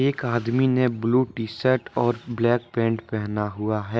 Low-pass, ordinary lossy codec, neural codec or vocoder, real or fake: none; none; none; real